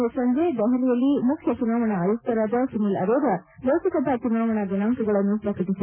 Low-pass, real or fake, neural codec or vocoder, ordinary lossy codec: 3.6 kHz; real; none; none